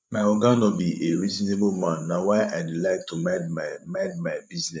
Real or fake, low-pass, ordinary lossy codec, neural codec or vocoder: fake; none; none; codec, 16 kHz, 16 kbps, FreqCodec, larger model